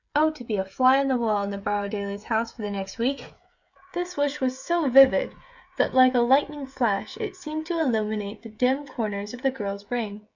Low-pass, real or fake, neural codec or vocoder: 7.2 kHz; fake; codec, 16 kHz, 16 kbps, FreqCodec, smaller model